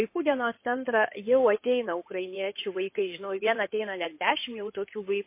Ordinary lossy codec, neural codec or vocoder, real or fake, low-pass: MP3, 24 kbps; codec, 16 kHz in and 24 kHz out, 2.2 kbps, FireRedTTS-2 codec; fake; 3.6 kHz